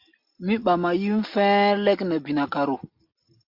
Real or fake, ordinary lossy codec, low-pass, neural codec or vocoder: real; MP3, 48 kbps; 5.4 kHz; none